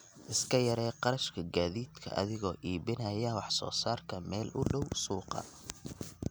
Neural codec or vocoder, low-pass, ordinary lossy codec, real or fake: none; none; none; real